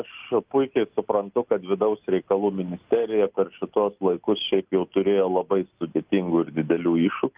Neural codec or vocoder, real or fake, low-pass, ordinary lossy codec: none; real; 3.6 kHz; Opus, 24 kbps